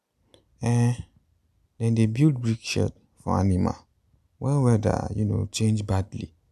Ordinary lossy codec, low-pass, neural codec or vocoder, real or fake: none; 14.4 kHz; none; real